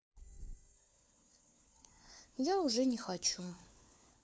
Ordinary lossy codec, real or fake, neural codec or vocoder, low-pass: none; fake; codec, 16 kHz, 4 kbps, FunCodec, trained on LibriTTS, 50 frames a second; none